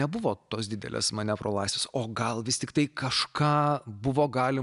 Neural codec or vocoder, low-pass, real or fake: none; 10.8 kHz; real